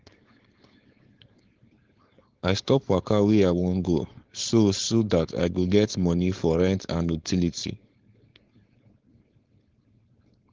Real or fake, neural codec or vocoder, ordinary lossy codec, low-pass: fake; codec, 16 kHz, 4.8 kbps, FACodec; Opus, 16 kbps; 7.2 kHz